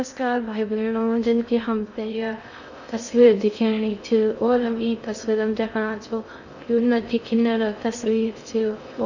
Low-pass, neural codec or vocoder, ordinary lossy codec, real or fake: 7.2 kHz; codec, 16 kHz in and 24 kHz out, 0.6 kbps, FocalCodec, streaming, 2048 codes; none; fake